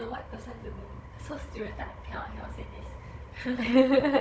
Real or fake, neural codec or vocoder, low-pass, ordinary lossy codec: fake; codec, 16 kHz, 16 kbps, FunCodec, trained on Chinese and English, 50 frames a second; none; none